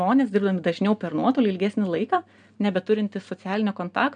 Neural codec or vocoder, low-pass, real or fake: none; 9.9 kHz; real